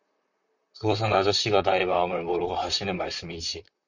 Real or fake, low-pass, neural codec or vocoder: fake; 7.2 kHz; vocoder, 44.1 kHz, 128 mel bands, Pupu-Vocoder